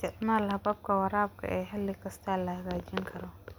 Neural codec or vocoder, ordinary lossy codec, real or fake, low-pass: none; none; real; none